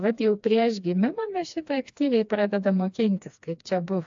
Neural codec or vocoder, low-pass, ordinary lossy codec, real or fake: codec, 16 kHz, 2 kbps, FreqCodec, smaller model; 7.2 kHz; AAC, 64 kbps; fake